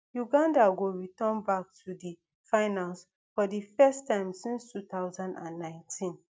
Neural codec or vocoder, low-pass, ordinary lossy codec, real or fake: none; none; none; real